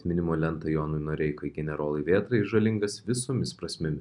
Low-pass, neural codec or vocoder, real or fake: 10.8 kHz; none; real